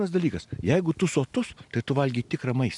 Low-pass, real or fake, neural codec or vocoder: 10.8 kHz; real; none